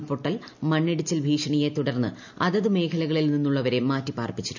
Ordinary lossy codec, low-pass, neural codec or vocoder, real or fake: none; 7.2 kHz; none; real